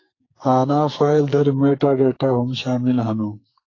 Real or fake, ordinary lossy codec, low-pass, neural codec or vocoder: fake; AAC, 32 kbps; 7.2 kHz; codec, 44.1 kHz, 2.6 kbps, SNAC